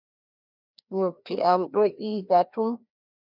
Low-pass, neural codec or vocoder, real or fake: 5.4 kHz; codec, 16 kHz, 1 kbps, FreqCodec, larger model; fake